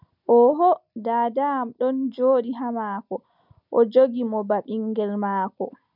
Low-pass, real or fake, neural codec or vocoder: 5.4 kHz; real; none